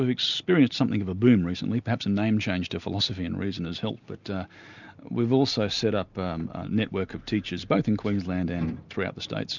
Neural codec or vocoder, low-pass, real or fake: none; 7.2 kHz; real